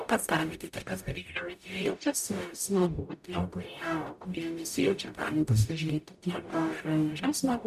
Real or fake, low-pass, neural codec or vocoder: fake; 14.4 kHz; codec, 44.1 kHz, 0.9 kbps, DAC